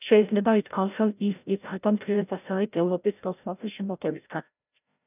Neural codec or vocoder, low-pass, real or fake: codec, 16 kHz, 0.5 kbps, FreqCodec, larger model; 3.6 kHz; fake